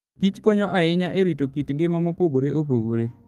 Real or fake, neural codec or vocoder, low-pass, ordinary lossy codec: fake; codec, 32 kHz, 1.9 kbps, SNAC; 14.4 kHz; Opus, 32 kbps